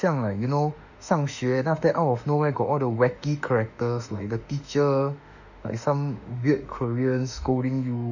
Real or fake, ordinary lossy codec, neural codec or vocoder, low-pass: fake; none; autoencoder, 48 kHz, 32 numbers a frame, DAC-VAE, trained on Japanese speech; 7.2 kHz